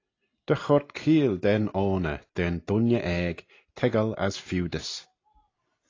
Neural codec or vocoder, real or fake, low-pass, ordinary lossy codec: none; real; 7.2 kHz; AAC, 32 kbps